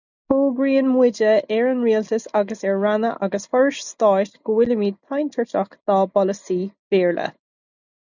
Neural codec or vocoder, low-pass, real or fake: none; 7.2 kHz; real